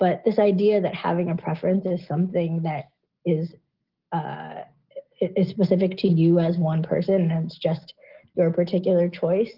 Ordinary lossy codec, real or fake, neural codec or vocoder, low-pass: Opus, 16 kbps; real; none; 5.4 kHz